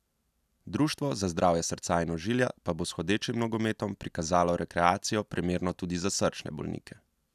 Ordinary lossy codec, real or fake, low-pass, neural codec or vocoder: none; real; 14.4 kHz; none